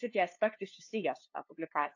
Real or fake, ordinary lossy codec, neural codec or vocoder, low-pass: fake; AAC, 48 kbps; codec, 16 kHz, 2 kbps, FunCodec, trained on LibriTTS, 25 frames a second; 7.2 kHz